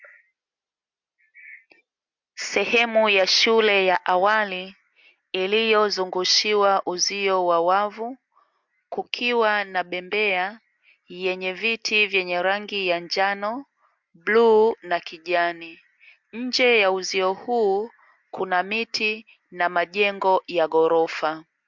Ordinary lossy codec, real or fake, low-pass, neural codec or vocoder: MP3, 64 kbps; real; 7.2 kHz; none